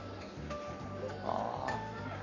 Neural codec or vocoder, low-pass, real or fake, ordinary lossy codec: codec, 44.1 kHz, 7.8 kbps, Pupu-Codec; 7.2 kHz; fake; none